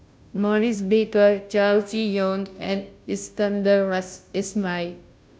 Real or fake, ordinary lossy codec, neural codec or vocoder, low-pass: fake; none; codec, 16 kHz, 0.5 kbps, FunCodec, trained on Chinese and English, 25 frames a second; none